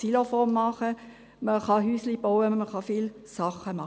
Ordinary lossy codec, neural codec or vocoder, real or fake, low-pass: none; none; real; none